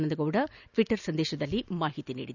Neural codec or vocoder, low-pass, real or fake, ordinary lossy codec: none; 7.2 kHz; real; none